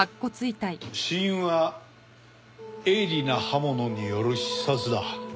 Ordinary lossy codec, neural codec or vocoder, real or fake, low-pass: none; none; real; none